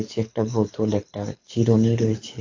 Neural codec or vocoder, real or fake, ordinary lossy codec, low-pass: codec, 24 kHz, 6 kbps, HILCodec; fake; AAC, 48 kbps; 7.2 kHz